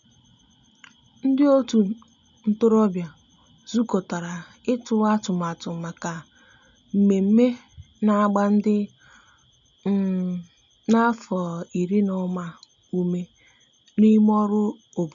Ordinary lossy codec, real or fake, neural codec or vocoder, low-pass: none; real; none; 7.2 kHz